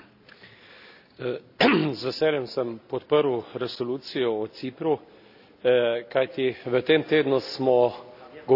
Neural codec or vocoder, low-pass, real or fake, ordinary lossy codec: none; 5.4 kHz; real; none